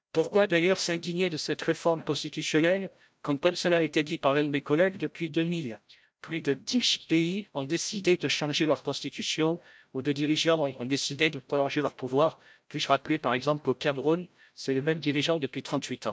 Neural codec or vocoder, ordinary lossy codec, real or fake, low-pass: codec, 16 kHz, 0.5 kbps, FreqCodec, larger model; none; fake; none